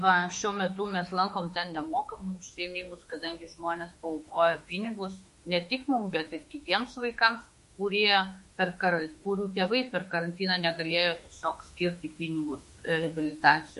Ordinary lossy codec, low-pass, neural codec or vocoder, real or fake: MP3, 48 kbps; 14.4 kHz; autoencoder, 48 kHz, 32 numbers a frame, DAC-VAE, trained on Japanese speech; fake